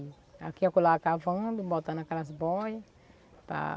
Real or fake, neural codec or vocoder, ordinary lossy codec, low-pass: real; none; none; none